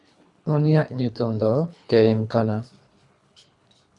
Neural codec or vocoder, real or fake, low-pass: codec, 24 kHz, 3 kbps, HILCodec; fake; 10.8 kHz